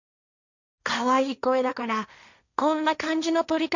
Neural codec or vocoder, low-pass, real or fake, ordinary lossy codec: codec, 16 kHz, 1.1 kbps, Voila-Tokenizer; 7.2 kHz; fake; none